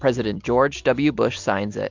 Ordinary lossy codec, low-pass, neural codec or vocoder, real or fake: MP3, 64 kbps; 7.2 kHz; none; real